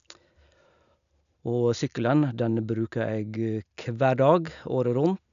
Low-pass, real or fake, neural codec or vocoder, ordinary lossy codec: 7.2 kHz; real; none; none